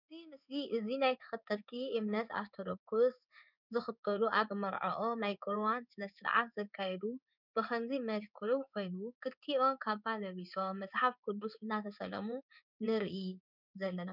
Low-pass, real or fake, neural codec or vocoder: 5.4 kHz; fake; codec, 16 kHz in and 24 kHz out, 1 kbps, XY-Tokenizer